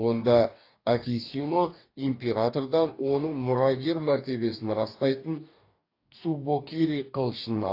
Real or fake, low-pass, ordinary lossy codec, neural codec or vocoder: fake; 5.4 kHz; AAC, 32 kbps; codec, 44.1 kHz, 2.6 kbps, DAC